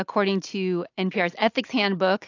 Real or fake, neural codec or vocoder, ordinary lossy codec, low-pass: real; none; AAC, 48 kbps; 7.2 kHz